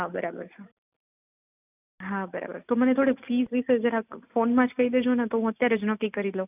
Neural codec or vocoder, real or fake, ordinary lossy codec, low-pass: none; real; none; 3.6 kHz